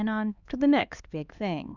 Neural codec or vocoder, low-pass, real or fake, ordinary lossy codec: codec, 16 kHz, 2 kbps, X-Codec, HuBERT features, trained on LibriSpeech; 7.2 kHz; fake; Opus, 64 kbps